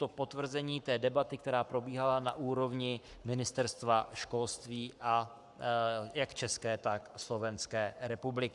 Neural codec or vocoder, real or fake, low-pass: codec, 44.1 kHz, 7.8 kbps, Pupu-Codec; fake; 10.8 kHz